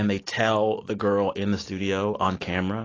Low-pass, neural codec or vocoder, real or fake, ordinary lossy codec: 7.2 kHz; none; real; AAC, 32 kbps